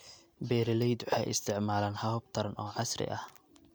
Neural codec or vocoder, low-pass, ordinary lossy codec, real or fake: none; none; none; real